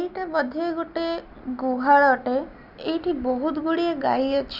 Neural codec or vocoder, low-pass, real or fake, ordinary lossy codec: none; 5.4 kHz; real; none